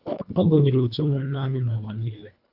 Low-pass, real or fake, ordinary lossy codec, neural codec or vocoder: 5.4 kHz; fake; AAC, 48 kbps; codec, 24 kHz, 1.5 kbps, HILCodec